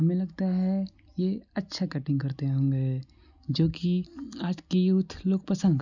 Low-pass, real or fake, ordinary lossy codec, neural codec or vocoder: 7.2 kHz; real; none; none